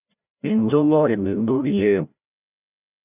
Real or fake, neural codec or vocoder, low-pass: fake; codec, 16 kHz, 0.5 kbps, FreqCodec, larger model; 3.6 kHz